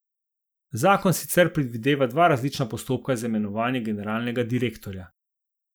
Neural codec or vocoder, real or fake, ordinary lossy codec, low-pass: none; real; none; none